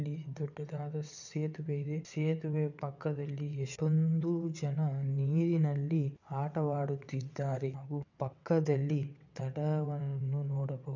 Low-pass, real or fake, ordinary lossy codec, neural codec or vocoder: 7.2 kHz; fake; none; vocoder, 22.05 kHz, 80 mel bands, WaveNeXt